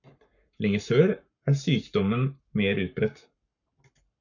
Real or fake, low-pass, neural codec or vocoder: fake; 7.2 kHz; codec, 44.1 kHz, 7.8 kbps, Pupu-Codec